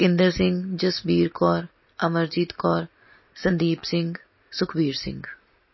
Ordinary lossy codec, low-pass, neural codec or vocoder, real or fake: MP3, 24 kbps; 7.2 kHz; none; real